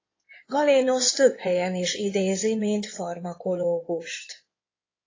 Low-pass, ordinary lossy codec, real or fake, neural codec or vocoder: 7.2 kHz; AAC, 32 kbps; fake; codec, 16 kHz in and 24 kHz out, 2.2 kbps, FireRedTTS-2 codec